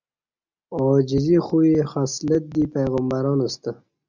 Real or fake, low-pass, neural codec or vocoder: real; 7.2 kHz; none